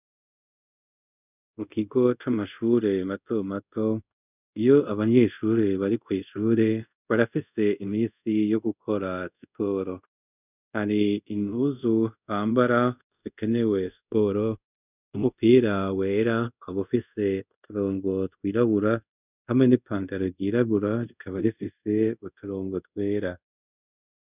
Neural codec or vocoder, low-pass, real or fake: codec, 24 kHz, 0.5 kbps, DualCodec; 3.6 kHz; fake